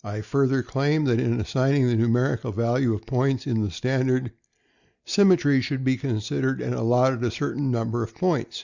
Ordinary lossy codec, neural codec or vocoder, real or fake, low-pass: Opus, 64 kbps; none; real; 7.2 kHz